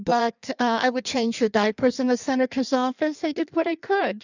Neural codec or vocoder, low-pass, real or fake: codec, 16 kHz in and 24 kHz out, 1.1 kbps, FireRedTTS-2 codec; 7.2 kHz; fake